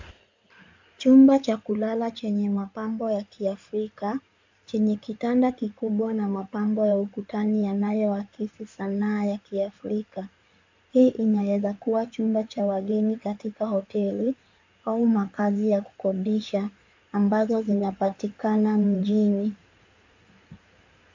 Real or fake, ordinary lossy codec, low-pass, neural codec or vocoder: fake; MP3, 64 kbps; 7.2 kHz; codec, 16 kHz in and 24 kHz out, 2.2 kbps, FireRedTTS-2 codec